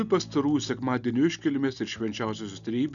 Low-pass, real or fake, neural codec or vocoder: 7.2 kHz; real; none